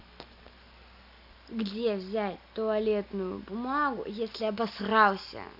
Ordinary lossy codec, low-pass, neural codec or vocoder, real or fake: none; 5.4 kHz; none; real